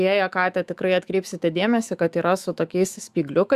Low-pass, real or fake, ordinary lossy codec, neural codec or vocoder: 14.4 kHz; fake; Opus, 64 kbps; autoencoder, 48 kHz, 128 numbers a frame, DAC-VAE, trained on Japanese speech